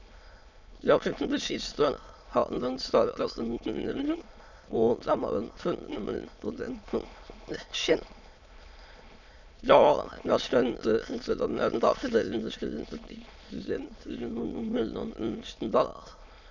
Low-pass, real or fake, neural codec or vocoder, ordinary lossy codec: 7.2 kHz; fake; autoencoder, 22.05 kHz, a latent of 192 numbers a frame, VITS, trained on many speakers; none